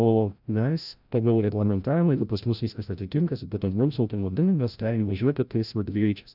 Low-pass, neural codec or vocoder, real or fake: 5.4 kHz; codec, 16 kHz, 0.5 kbps, FreqCodec, larger model; fake